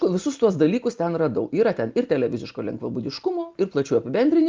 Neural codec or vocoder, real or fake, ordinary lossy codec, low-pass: none; real; Opus, 24 kbps; 7.2 kHz